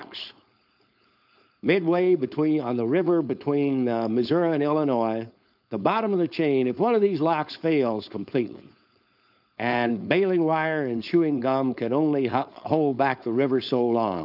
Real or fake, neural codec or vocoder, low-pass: fake; codec, 16 kHz, 4.8 kbps, FACodec; 5.4 kHz